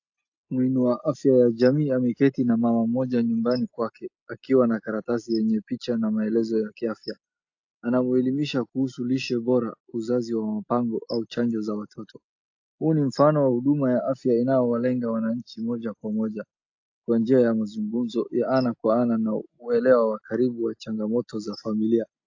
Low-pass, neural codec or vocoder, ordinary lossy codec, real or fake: 7.2 kHz; none; AAC, 48 kbps; real